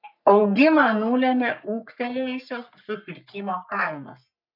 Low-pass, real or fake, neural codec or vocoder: 5.4 kHz; fake; codec, 44.1 kHz, 3.4 kbps, Pupu-Codec